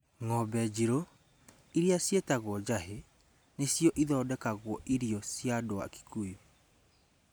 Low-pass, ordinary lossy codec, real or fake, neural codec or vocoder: none; none; real; none